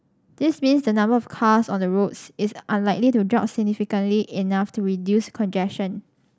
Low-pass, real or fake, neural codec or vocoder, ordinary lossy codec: none; real; none; none